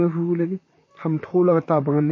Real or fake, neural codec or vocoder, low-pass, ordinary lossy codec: fake; vocoder, 44.1 kHz, 128 mel bands, Pupu-Vocoder; 7.2 kHz; MP3, 32 kbps